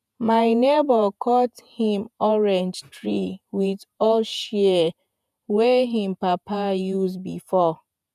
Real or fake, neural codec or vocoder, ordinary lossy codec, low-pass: fake; vocoder, 48 kHz, 128 mel bands, Vocos; none; 14.4 kHz